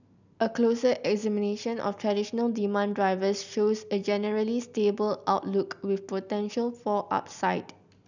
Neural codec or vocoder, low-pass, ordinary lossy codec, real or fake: none; 7.2 kHz; none; real